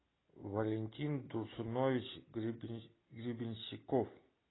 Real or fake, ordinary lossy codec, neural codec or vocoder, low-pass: real; AAC, 16 kbps; none; 7.2 kHz